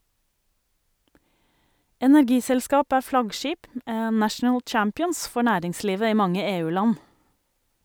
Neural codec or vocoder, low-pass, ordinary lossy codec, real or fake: none; none; none; real